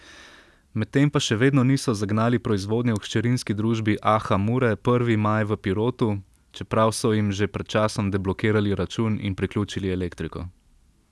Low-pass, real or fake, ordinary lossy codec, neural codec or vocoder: none; real; none; none